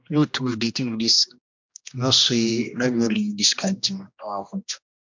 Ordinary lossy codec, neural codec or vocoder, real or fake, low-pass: MP3, 64 kbps; codec, 16 kHz, 1 kbps, X-Codec, HuBERT features, trained on general audio; fake; 7.2 kHz